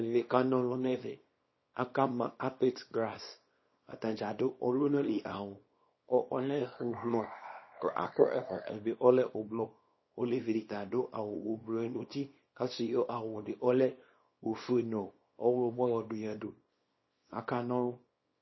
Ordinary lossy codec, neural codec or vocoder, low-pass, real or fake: MP3, 24 kbps; codec, 24 kHz, 0.9 kbps, WavTokenizer, small release; 7.2 kHz; fake